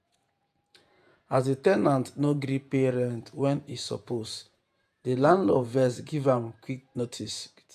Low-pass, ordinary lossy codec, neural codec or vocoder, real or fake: 14.4 kHz; none; vocoder, 48 kHz, 128 mel bands, Vocos; fake